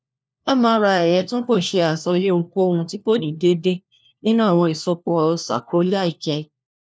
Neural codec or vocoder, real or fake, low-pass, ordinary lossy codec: codec, 16 kHz, 1 kbps, FunCodec, trained on LibriTTS, 50 frames a second; fake; none; none